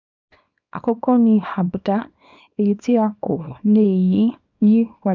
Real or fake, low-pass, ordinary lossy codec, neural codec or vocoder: fake; 7.2 kHz; none; codec, 24 kHz, 0.9 kbps, WavTokenizer, small release